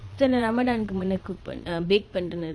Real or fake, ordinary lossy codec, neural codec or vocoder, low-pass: fake; none; vocoder, 22.05 kHz, 80 mel bands, Vocos; none